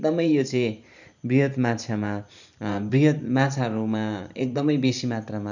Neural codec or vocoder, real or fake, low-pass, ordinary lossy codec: vocoder, 44.1 kHz, 128 mel bands every 512 samples, BigVGAN v2; fake; 7.2 kHz; none